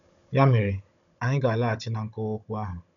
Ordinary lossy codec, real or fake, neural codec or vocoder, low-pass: none; fake; codec, 16 kHz, 16 kbps, FunCodec, trained on Chinese and English, 50 frames a second; 7.2 kHz